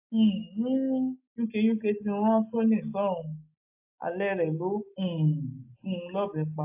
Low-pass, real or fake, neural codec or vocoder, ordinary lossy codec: 3.6 kHz; real; none; AAC, 32 kbps